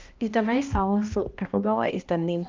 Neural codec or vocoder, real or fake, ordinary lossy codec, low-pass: codec, 16 kHz, 1 kbps, X-Codec, HuBERT features, trained on balanced general audio; fake; Opus, 32 kbps; 7.2 kHz